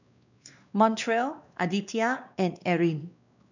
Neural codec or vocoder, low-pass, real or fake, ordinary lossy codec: codec, 16 kHz, 2 kbps, X-Codec, WavLM features, trained on Multilingual LibriSpeech; 7.2 kHz; fake; none